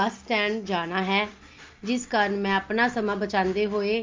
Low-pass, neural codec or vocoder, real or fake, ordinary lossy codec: 7.2 kHz; none; real; Opus, 24 kbps